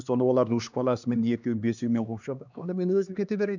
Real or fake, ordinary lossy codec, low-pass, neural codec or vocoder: fake; none; 7.2 kHz; codec, 16 kHz, 2 kbps, X-Codec, HuBERT features, trained on LibriSpeech